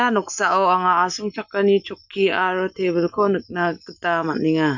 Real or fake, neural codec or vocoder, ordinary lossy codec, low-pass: real; none; none; 7.2 kHz